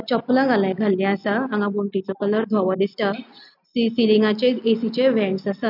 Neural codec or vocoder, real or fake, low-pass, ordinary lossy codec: none; real; 5.4 kHz; none